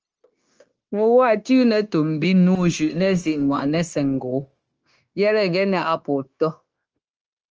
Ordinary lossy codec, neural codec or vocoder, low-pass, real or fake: Opus, 24 kbps; codec, 16 kHz, 0.9 kbps, LongCat-Audio-Codec; 7.2 kHz; fake